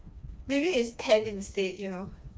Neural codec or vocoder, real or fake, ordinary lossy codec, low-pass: codec, 16 kHz, 2 kbps, FreqCodec, smaller model; fake; none; none